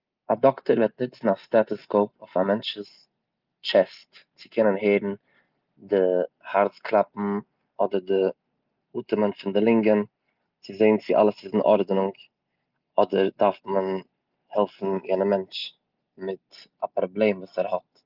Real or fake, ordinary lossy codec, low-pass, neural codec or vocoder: real; Opus, 24 kbps; 5.4 kHz; none